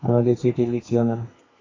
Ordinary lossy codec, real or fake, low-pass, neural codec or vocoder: AAC, 32 kbps; fake; 7.2 kHz; codec, 32 kHz, 1.9 kbps, SNAC